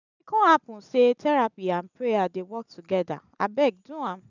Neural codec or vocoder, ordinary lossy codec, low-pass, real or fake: none; none; 7.2 kHz; real